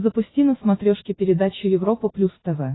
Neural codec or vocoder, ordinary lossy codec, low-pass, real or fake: none; AAC, 16 kbps; 7.2 kHz; real